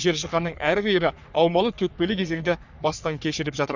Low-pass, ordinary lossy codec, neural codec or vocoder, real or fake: 7.2 kHz; none; codec, 44.1 kHz, 3.4 kbps, Pupu-Codec; fake